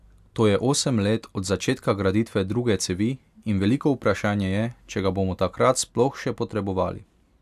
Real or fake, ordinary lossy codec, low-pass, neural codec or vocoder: real; AAC, 96 kbps; 14.4 kHz; none